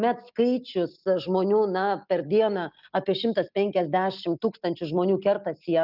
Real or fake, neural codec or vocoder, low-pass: real; none; 5.4 kHz